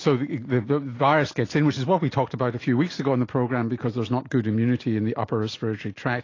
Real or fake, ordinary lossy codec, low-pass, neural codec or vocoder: real; AAC, 32 kbps; 7.2 kHz; none